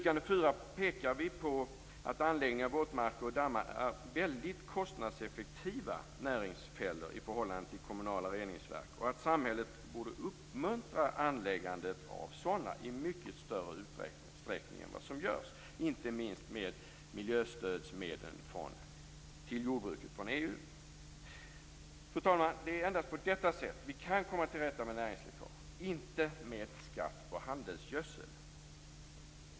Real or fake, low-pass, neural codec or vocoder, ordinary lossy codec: real; none; none; none